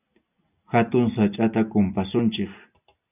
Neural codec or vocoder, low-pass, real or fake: none; 3.6 kHz; real